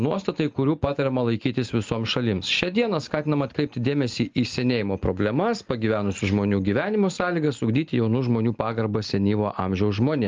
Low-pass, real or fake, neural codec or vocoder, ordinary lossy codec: 7.2 kHz; real; none; Opus, 32 kbps